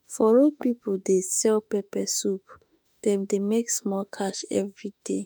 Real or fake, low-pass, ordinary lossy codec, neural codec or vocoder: fake; none; none; autoencoder, 48 kHz, 32 numbers a frame, DAC-VAE, trained on Japanese speech